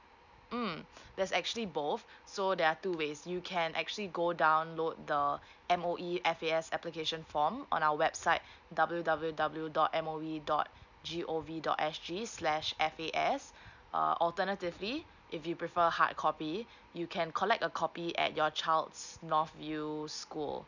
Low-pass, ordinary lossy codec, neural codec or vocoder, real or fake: 7.2 kHz; none; none; real